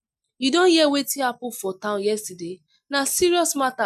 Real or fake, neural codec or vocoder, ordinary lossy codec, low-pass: real; none; none; 14.4 kHz